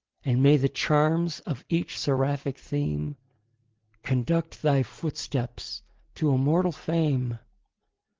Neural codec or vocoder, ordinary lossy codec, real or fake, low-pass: none; Opus, 16 kbps; real; 7.2 kHz